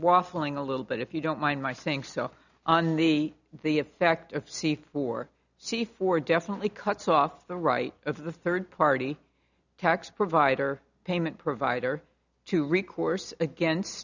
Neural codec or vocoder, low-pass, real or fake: none; 7.2 kHz; real